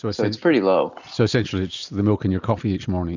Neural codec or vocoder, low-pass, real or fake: none; 7.2 kHz; real